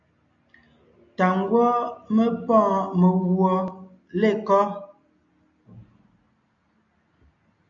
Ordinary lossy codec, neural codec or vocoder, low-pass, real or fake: MP3, 96 kbps; none; 7.2 kHz; real